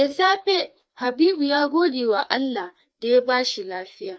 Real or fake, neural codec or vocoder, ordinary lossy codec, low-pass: fake; codec, 16 kHz, 2 kbps, FreqCodec, larger model; none; none